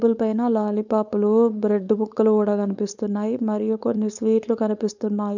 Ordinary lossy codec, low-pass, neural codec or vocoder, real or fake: none; 7.2 kHz; codec, 16 kHz, 4.8 kbps, FACodec; fake